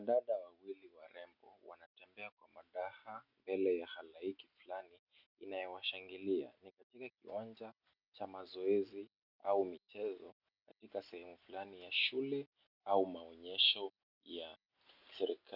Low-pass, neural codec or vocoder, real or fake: 5.4 kHz; none; real